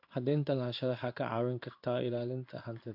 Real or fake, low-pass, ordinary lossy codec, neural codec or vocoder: fake; 5.4 kHz; AAC, 48 kbps; codec, 16 kHz in and 24 kHz out, 1 kbps, XY-Tokenizer